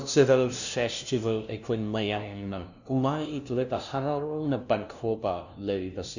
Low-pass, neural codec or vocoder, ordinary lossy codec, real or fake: 7.2 kHz; codec, 16 kHz, 0.5 kbps, FunCodec, trained on LibriTTS, 25 frames a second; none; fake